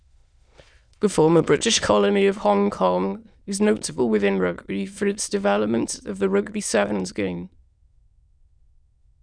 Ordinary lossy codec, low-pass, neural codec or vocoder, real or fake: none; 9.9 kHz; autoencoder, 22.05 kHz, a latent of 192 numbers a frame, VITS, trained on many speakers; fake